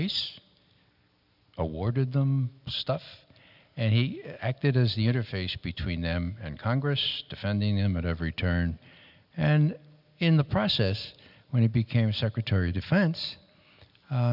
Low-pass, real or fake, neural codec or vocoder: 5.4 kHz; real; none